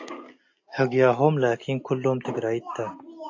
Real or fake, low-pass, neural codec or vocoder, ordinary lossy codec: real; 7.2 kHz; none; AAC, 48 kbps